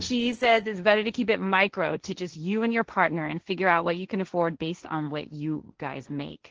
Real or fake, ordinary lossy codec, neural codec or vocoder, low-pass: fake; Opus, 16 kbps; codec, 16 kHz, 1.1 kbps, Voila-Tokenizer; 7.2 kHz